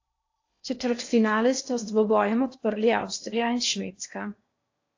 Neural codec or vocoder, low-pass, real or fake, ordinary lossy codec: codec, 16 kHz in and 24 kHz out, 0.8 kbps, FocalCodec, streaming, 65536 codes; 7.2 kHz; fake; AAC, 48 kbps